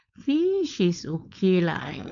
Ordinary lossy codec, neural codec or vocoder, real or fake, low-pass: none; codec, 16 kHz, 4.8 kbps, FACodec; fake; 7.2 kHz